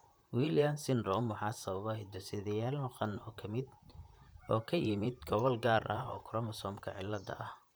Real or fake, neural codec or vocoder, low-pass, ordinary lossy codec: fake; vocoder, 44.1 kHz, 128 mel bands, Pupu-Vocoder; none; none